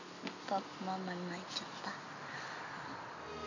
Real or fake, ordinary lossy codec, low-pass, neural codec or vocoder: real; none; 7.2 kHz; none